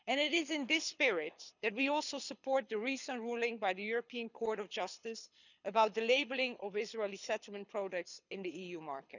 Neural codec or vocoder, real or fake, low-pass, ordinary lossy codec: codec, 24 kHz, 6 kbps, HILCodec; fake; 7.2 kHz; none